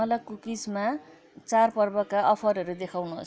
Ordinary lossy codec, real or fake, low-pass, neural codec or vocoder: none; real; none; none